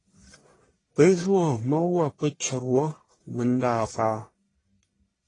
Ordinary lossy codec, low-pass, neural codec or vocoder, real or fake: AAC, 32 kbps; 10.8 kHz; codec, 44.1 kHz, 1.7 kbps, Pupu-Codec; fake